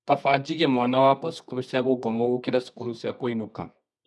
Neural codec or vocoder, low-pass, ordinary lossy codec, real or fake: codec, 24 kHz, 0.9 kbps, WavTokenizer, medium music audio release; none; none; fake